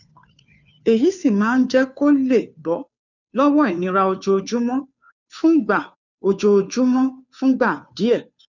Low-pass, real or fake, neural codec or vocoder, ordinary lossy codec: 7.2 kHz; fake; codec, 16 kHz, 2 kbps, FunCodec, trained on Chinese and English, 25 frames a second; none